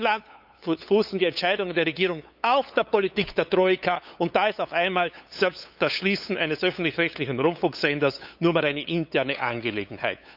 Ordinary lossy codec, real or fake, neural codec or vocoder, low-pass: none; fake; codec, 16 kHz, 8 kbps, FunCodec, trained on LibriTTS, 25 frames a second; 5.4 kHz